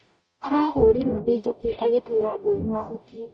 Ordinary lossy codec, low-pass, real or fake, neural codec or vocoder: none; 9.9 kHz; fake; codec, 44.1 kHz, 0.9 kbps, DAC